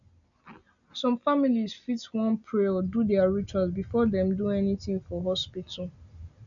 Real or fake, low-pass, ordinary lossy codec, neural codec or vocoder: real; 7.2 kHz; none; none